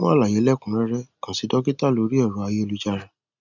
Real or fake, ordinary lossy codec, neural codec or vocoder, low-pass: real; none; none; 7.2 kHz